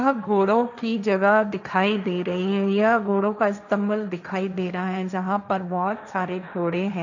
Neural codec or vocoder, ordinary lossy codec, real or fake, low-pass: codec, 16 kHz, 1.1 kbps, Voila-Tokenizer; none; fake; 7.2 kHz